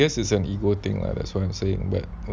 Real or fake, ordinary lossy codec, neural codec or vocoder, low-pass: real; none; none; none